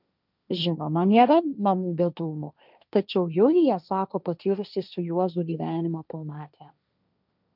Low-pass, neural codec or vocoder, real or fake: 5.4 kHz; codec, 16 kHz, 1.1 kbps, Voila-Tokenizer; fake